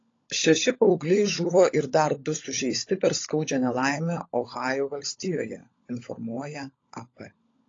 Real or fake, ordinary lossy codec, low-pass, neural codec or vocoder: fake; AAC, 32 kbps; 7.2 kHz; codec, 16 kHz, 16 kbps, FunCodec, trained on LibriTTS, 50 frames a second